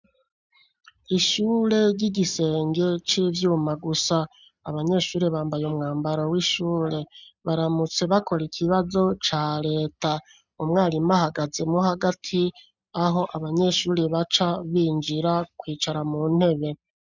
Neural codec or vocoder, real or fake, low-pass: none; real; 7.2 kHz